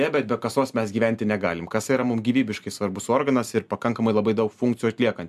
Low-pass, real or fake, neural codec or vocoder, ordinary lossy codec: 14.4 kHz; real; none; MP3, 96 kbps